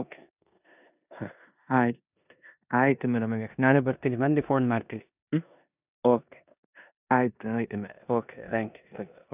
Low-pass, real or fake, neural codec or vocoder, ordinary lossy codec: 3.6 kHz; fake; codec, 16 kHz in and 24 kHz out, 0.9 kbps, LongCat-Audio-Codec, four codebook decoder; none